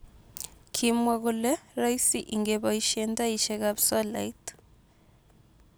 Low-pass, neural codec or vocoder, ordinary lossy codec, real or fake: none; none; none; real